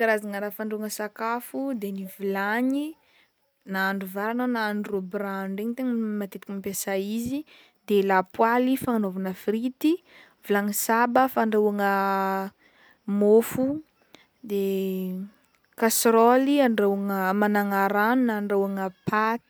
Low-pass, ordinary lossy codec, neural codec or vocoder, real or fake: none; none; none; real